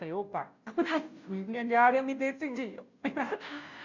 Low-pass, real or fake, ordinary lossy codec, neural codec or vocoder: 7.2 kHz; fake; none; codec, 16 kHz, 0.5 kbps, FunCodec, trained on Chinese and English, 25 frames a second